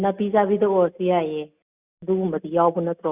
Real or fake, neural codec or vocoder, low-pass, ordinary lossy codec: real; none; 3.6 kHz; Opus, 64 kbps